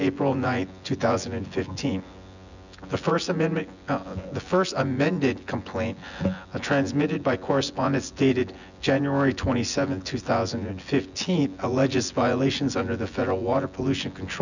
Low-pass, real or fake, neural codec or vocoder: 7.2 kHz; fake; vocoder, 24 kHz, 100 mel bands, Vocos